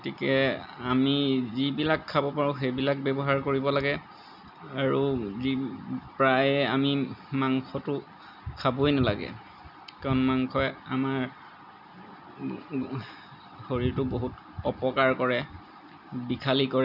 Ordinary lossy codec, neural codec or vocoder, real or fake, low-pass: none; none; real; 5.4 kHz